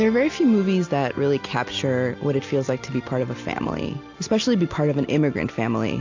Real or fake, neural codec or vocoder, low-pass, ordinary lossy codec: real; none; 7.2 kHz; AAC, 48 kbps